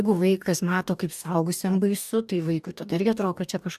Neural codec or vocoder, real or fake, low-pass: codec, 44.1 kHz, 2.6 kbps, DAC; fake; 14.4 kHz